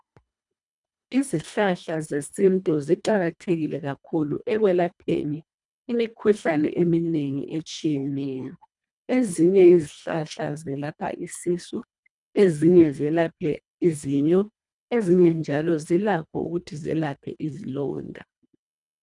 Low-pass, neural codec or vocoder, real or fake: 10.8 kHz; codec, 24 kHz, 1.5 kbps, HILCodec; fake